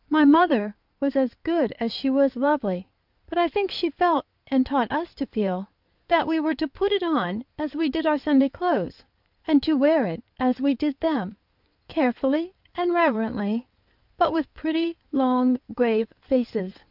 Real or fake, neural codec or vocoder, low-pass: fake; codec, 16 kHz in and 24 kHz out, 2.2 kbps, FireRedTTS-2 codec; 5.4 kHz